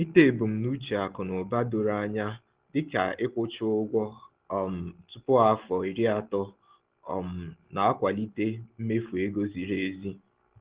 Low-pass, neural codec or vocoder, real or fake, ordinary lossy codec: 3.6 kHz; none; real; Opus, 16 kbps